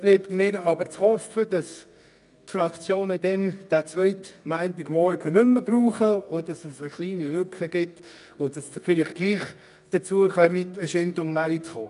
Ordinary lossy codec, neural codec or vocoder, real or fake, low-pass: none; codec, 24 kHz, 0.9 kbps, WavTokenizer, medium music audio release; fake; 10.8 kHz